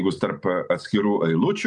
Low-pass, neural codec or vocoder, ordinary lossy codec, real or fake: 10.8 kHz; none; MP3, 96 kbps; real